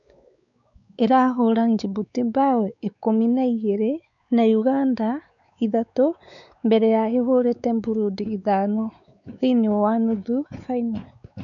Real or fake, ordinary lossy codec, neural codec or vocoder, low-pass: fake; none; codec, 16 kHz, 4 kbps, X-Codec, WavLM features, trained on Multilingual LibriSpeech; 7.2 kHz